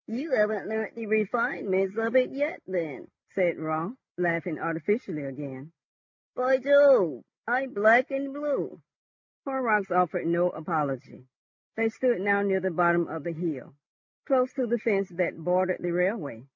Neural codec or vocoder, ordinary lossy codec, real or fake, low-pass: none; MP3, 48 kbps; real; 7.2 kHz